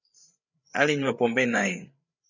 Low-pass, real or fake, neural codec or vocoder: 7.2 kHz; fake; codec, 16 kHz, 8 kbps, FreqCodec, larger model